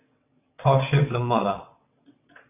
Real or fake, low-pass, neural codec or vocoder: fake; 3.6 kHz; vocoder, 22.05 kHz, 80 mel bands, WaveNeXt